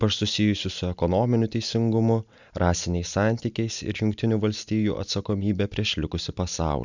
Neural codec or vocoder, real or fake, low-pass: none; real; 7.2 kHz